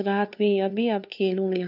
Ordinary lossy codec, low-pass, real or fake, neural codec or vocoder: none; 5.4 kHz; fake; codec, 16 kHz, 2 kbps, FunCodec, trained on LibriTTS, 25 frames a second